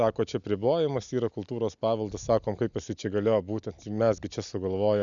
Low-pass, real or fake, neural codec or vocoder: 7.2 kHz; real; none